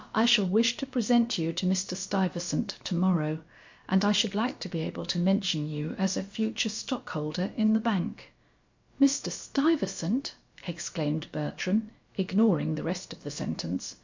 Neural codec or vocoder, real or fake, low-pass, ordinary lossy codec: codec, 16 kHz, about 1 kbps, DyCAST, with the encoder's durations; fake; 7.2 kHz; MP3, 48 kbps